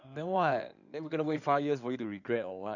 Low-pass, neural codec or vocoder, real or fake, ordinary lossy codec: 7.2 kHz; codec, 16 kHz in and 24 kHz out, 2.2 kbps, FireRedTTS-2 codec; fake; none